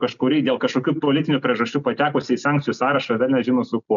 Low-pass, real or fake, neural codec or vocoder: 7.2 kHz; real; none